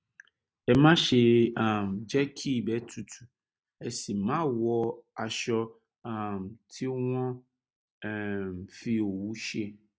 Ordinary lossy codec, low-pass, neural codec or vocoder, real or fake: none; none; none; real